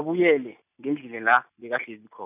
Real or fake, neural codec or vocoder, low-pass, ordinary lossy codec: real; none; 3.6 kHz; none